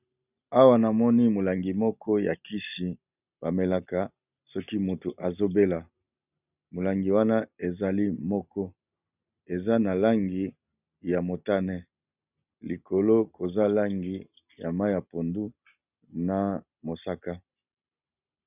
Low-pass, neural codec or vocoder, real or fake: 3.6 kHz; none; real